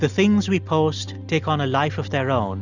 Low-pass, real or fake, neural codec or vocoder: 7.2 kHz; real; none